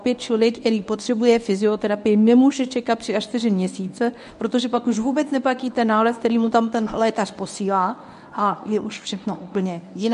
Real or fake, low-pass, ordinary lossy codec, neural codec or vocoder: fake; 10.8 kHz; AAC, 96 kbps; codec, 24 kHz, 0.9 kbps, WavTokenizer, medium speech release version 1